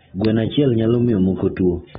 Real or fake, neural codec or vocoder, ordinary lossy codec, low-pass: real; none; AAC, 16 kbps; 7.2 kHz